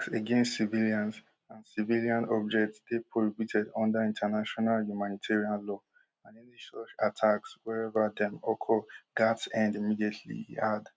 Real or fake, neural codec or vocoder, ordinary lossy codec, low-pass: real; none; none; none